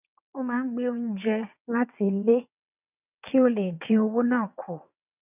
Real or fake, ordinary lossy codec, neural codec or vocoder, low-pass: fake; none; vocoder, 22.05 kHz, 80 mel bands, WaveNeXt; 3.6 kHz